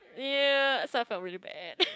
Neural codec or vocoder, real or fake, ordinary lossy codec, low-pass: codec, 16 kHz, 6 kbps, DAC; fake; none; none